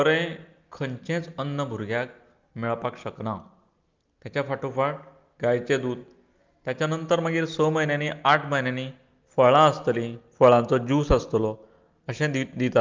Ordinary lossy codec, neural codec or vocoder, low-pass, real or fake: Opus, 24 kbps; none; 7.2 kHz; real